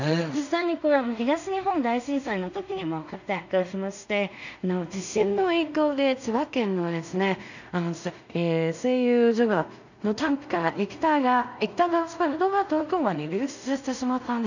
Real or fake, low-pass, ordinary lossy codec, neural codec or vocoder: fake; 7.2 kHz; none; codec, 16 kHz in and 24 kHz out, 0.4 kbps, LongCat-Audio-Codec, two codebook decoder